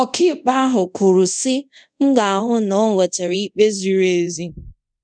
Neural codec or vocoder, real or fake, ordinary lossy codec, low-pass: codec, 24 kHz, 0.5 kbps, DualCodec; fake; none; 9.9 kHz